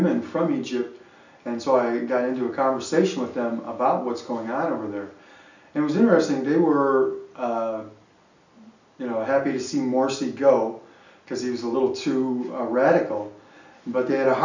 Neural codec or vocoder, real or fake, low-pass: none; real; 7.2 kHz